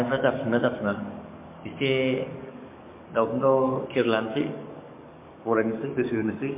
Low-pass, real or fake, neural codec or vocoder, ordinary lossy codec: 3.6 kHz; fake; codec, 44.1 kHz, 7.8 kbps, DAC; MP3, 24 kbps